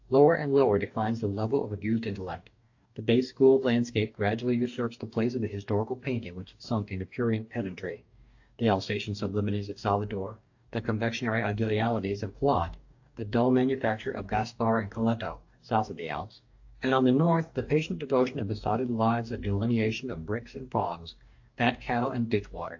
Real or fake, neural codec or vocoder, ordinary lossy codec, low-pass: fake; codec, 44.1 kHz, 2.6 kbps, DAC; AAC, 48 kbps; 7.2 kHz